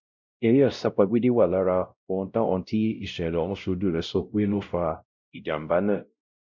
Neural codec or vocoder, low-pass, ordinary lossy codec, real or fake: codec, 16 kHz, 0.5 kbps, X-Codec, WavLM features, trained on Multilingual LibriSpeech; 7.2 kHz; none; fake